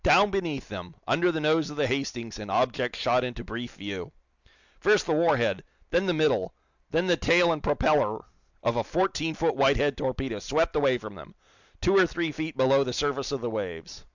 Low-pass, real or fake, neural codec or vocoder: 7.2 kHz; real; none